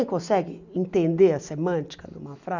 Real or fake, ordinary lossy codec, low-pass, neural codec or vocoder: real; none; 7.2 kHz; none